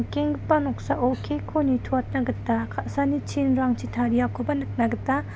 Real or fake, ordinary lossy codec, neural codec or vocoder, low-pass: real; none; none; none